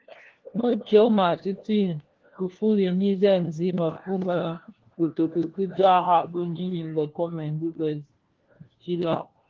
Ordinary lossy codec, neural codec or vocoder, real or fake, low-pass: Opus, 16 kbps; codec, 16 kHz, 1 kbps, FunCodec, trained on LibriTTS, 50 frames a second; fake; 7.2 kHz